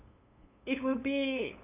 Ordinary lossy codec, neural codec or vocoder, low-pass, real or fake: none; codec, 16 kHz, 8 kbps, FunCodec, trained on LibriTTS, 25 frames a second; 3.6 kHz; fake